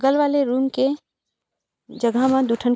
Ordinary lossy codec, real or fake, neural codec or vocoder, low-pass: none; real; none; none